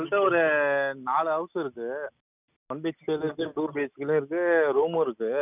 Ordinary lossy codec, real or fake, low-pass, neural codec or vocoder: none; real; 3.6 kHz; none